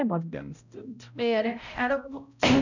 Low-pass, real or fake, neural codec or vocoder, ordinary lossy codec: 7.2 kHz; fake; codec, 16 kHz, 0.5 kbps, X-Codec, HuBERT features, trained on balanced general audio; none